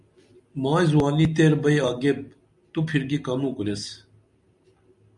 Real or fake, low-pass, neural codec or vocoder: real; 10.8 kHz; none